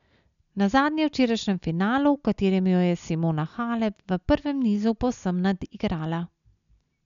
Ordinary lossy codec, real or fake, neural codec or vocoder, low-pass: none; real; none; 7.2 kHz